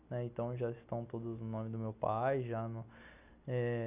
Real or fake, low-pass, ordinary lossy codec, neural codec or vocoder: real; 3.6 kHz; none; none